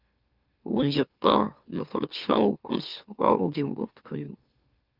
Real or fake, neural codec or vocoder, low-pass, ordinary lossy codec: fake; autoencoder, 44.1 kHz, a latent of 192 numbers a frame, MeloTTS; 5.4 kHz; Opus, 24 kbps